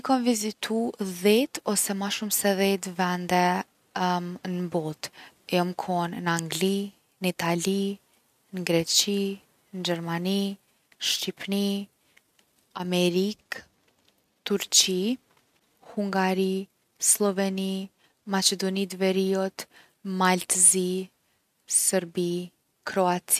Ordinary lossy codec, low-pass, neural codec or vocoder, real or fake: none; 14.4 kHz; none; real